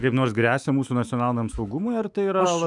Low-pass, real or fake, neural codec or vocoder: 10.8 kHz; fake; autoencoder, 48 kHz, 128 numbers a frame, DAC-VAE, trained on Japanese speech